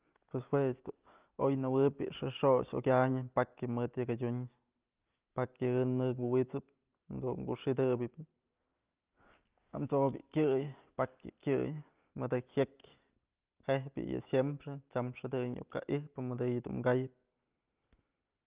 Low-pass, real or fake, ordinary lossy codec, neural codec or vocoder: 3.6 kHz; real; Opus, 24 kbps; none